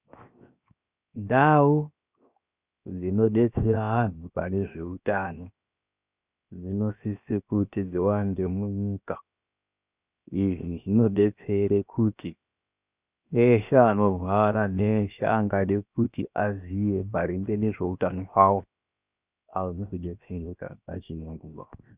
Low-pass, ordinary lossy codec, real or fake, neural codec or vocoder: 3.6 kHz; Opus, 64 kbps; fake; codec, 16 kHz, 0.7 kbps, FocalCodec